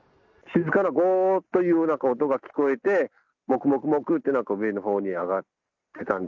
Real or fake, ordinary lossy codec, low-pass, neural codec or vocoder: real; none; 7.2 kHz; none